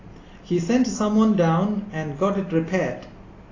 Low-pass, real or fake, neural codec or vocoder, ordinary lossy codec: 7.2 kHz; real; none; AAC, 32 kbps